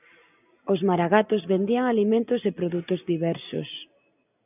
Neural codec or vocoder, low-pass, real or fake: none; 3.6 kHz; real